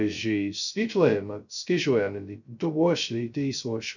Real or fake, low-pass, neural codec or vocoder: fake; 7.2 kHz; codec, 16 kHz, 0.2 kbps, FocalCodec